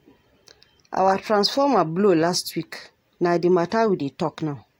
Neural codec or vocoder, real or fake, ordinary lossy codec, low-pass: none; real; AAC, 48 kbps; 19.8 kHz